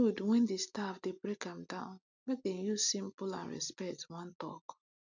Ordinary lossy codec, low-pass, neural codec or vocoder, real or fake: none; 7.2 kHz; none; real